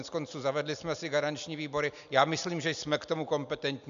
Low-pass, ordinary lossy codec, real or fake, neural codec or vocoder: 7.2 kHz; AAC, 96 kbps; real; none